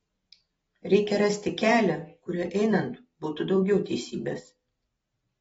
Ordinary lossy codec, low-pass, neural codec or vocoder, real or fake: AAC, 24 kbps; 19.8 kHz; none; real